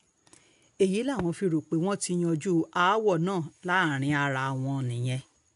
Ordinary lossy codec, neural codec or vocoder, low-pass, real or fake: none; none; 10.8 kHz; real